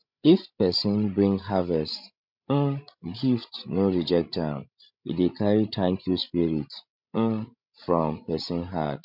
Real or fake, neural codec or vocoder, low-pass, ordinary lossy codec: fake; codec, 16 kHz, 16 kbps, FreqCodec, larger model; 5.4 kHz; MP3, 48 kbps